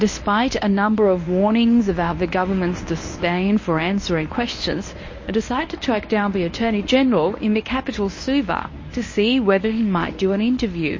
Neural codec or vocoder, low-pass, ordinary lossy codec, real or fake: codec, 24 kHz, 0.9 kbps, WavTokenizer, medium speech release version 1; 7.2 kHz; MP3, 32 kbps; fake